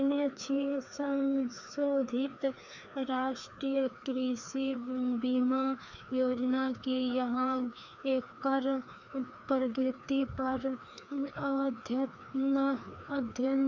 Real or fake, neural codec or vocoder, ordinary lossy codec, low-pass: fake; codec, 16 kHz, 2 kbps, FreqCodec, larger model; none; 7.2 kHz